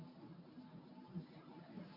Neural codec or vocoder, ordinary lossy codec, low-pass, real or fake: codec, 24 kHz, 0.9 kbps, WavTokenizer, medium speech release version 1; MP3, 32 kbps; 5.4 kHz; fake